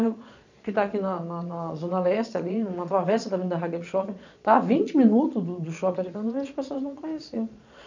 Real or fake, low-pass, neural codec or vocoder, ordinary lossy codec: fake; 7.2 kHz; vocoder, 22.05 kHz, 80 mel bands, Vocos; none